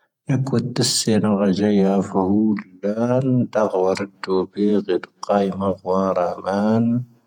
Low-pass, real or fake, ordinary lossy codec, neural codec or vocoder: 19.8 kHz; fake; none; vocoder, 48 kHz, 128 mel bands, Vocos